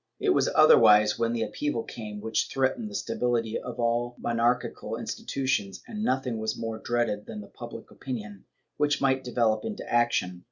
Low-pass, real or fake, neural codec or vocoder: 7.2 kHz; real; none